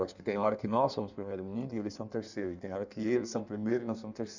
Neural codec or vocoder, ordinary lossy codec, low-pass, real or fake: codec, 16 kHz in and 24 kHz out, 1.1 kbps, FireRedTTS-2 codec; none; 7.2 kHz; fake